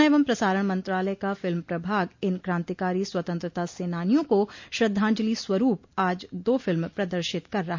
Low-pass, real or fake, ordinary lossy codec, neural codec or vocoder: 7.2 kHz; real; MP3, 48 kbps; none